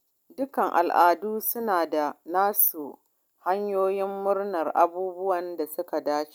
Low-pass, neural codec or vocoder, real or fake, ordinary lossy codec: none; none; real; none